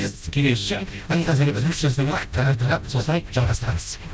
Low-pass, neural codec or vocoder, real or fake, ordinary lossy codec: none; codec, 16 kHz, 0.5 kbps, FreqCodec, smaller model; fake; none